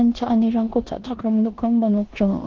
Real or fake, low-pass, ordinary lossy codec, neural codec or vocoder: fake; 7.2 kHz; Opus, 16 kbps; codec, 16 kHz in and 24 kHz out, 0.9 kbps, LongCat-Audio-Codec, four codebook decoder